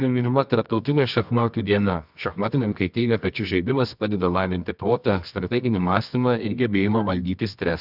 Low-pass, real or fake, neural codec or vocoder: 5.4 kHz; fake; codec, 24 kHz, 0.9 kbps, WavTokenizer, medium music audio release